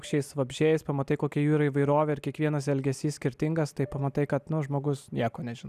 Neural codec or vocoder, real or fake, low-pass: none; real; 14.4 kHz